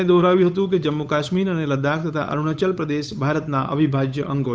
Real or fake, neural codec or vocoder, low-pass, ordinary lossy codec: fake; codec, 16 kHz, 8 kbps, FunCodec, trained on Chinese and English, 25 frames a second; none; none